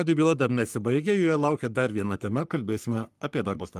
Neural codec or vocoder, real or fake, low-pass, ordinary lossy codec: codec, 44.1 kHz, 3.4 kbps, Pupu-Codec; fake; 14.4 kHz; Opus, 32 kbps